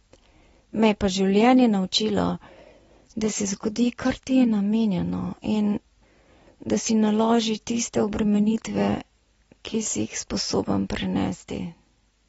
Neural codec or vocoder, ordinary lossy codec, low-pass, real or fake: none; AAC, 24 kbps; 19.8 kHz; real